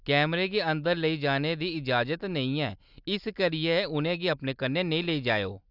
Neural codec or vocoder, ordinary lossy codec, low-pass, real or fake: none; Opus, 64 kbps; 5.4 kHz; real